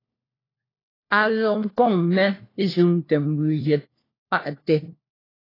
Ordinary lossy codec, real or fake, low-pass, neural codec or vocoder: AAC, 24 kbps; fake; 5.4 kHz; codec, 16 kHz, 1 kbps, FunCodec, trained on LibriTTS, 50 frames a second